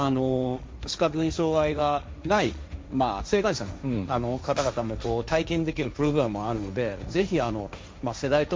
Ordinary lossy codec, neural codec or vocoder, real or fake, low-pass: none; codec, 16 kHz, 1.1 kbps, Voila-Tokenizer; fake; none